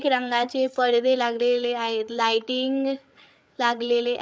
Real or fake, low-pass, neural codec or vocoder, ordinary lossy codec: fake; none; codec, 16 kHz, 8 kbps, FreqCodec, larger model; none